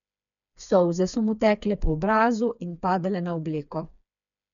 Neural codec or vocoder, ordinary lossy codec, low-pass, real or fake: codec, 16 kHz, 4 kbps, FreqCodec, smaller model; none; 7.2 kHz; fake